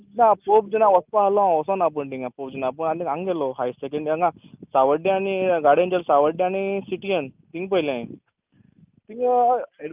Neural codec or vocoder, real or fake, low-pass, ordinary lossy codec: none; real; 3.6 kHz; Opus, 24 kbps